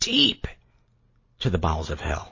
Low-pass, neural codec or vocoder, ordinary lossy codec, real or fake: 7.2 kHz; none; MP3, 32 kbps; real